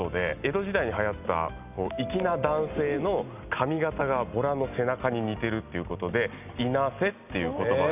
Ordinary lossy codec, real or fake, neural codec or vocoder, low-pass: none; real; none; 3.6 kHz